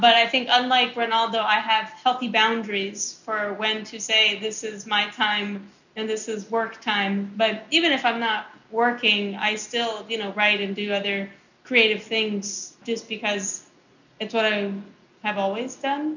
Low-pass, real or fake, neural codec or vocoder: 7.2 kHz; real; none